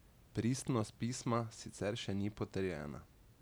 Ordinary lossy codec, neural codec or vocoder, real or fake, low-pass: none; none; real; none